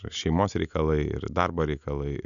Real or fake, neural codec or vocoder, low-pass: real; none; 7.2 kHz